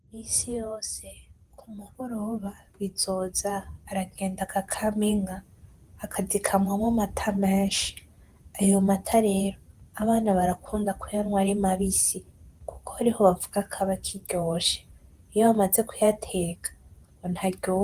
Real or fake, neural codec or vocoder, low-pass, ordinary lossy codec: fake; vocoder, 48 kHz, 128 mel bands, Vocos; 14.4 kHz; Opus, 24 kbps